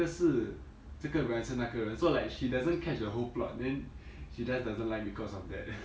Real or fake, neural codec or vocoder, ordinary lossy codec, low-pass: real; none; none; none